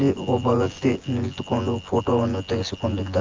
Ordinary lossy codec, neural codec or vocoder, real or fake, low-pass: Opus, 24 kbps; vocoder, 24 kHz, 100 mel bands, Vocos; fake; 7.2 kHz